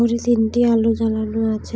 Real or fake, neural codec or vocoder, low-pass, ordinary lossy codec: real; none; none; none